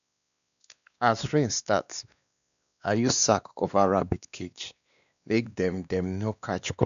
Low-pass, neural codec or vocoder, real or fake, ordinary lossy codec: 7.2 kHz; codec, 16 kHz, 2 kbps, X-Codec, WavLM features, trained on Multilingual LibriSpeech; fake; none